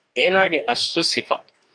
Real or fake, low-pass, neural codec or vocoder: fake; 9.9 kHz; codec, 44.1 kHz, 2.6 kbps, DAC